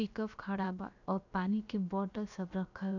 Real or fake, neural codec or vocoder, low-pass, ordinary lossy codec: fake; codec, 16 kHz, about 1 kbps, DyCAST, with the encoder's durations; 7.2 kHz; none